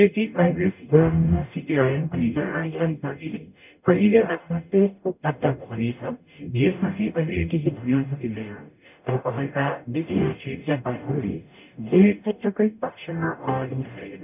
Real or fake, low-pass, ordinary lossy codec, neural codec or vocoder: fake; 3.6 kHz; none; codec, 44.1 kHz, 0.9 kbps, DAC